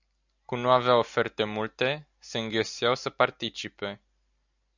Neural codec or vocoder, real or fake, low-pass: none; real; 7.2 kHz